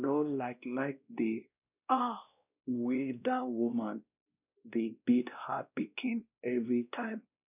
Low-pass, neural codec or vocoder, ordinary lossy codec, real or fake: 3.6 kHz; codec, 16 kHz, 1 kbps, X-Codec, WavLM features, trained on Multilingual LibriSpeech; none; fake